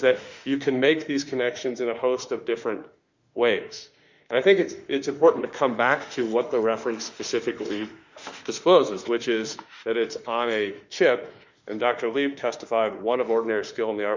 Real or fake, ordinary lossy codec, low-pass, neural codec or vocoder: fake; Opus, 64 kbps; 7.2 kHz; autoencoder, 48 kHz, 32 numbers a frame, DAC-VAE, trained on Japanese speech